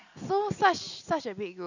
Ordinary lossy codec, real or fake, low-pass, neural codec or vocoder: none; real; 7.2 kHz; none